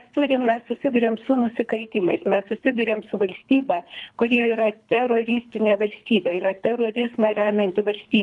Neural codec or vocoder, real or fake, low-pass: codec, 24 kHz, 3 kbps, HILCodec; fake; 10.8 kHz